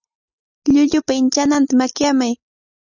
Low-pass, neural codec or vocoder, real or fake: 7.2 kHz; none; real